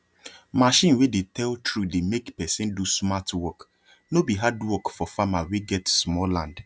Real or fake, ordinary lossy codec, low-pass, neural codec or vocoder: real; none; none; none